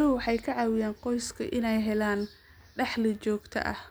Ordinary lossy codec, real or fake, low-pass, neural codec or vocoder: none; real; none; none